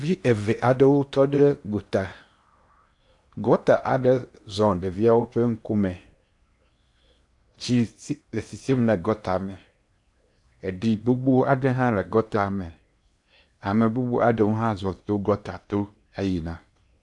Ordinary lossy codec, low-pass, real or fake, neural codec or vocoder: AAC, 64 kbps; 10.8 kHz; fake; codec, 16 kHz in and 24 kHz out, 0.8 kbps, FocalCodec, streaming, 65536 codes